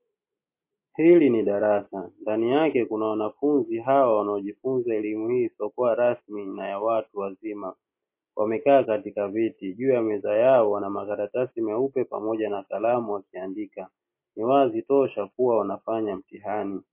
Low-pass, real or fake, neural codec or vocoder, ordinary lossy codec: 3.6 kHz; real; none; MP3, 24 kbps